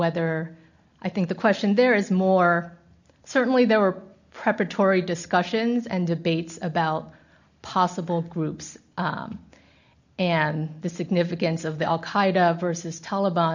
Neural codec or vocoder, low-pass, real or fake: none; 7.2 kHz; real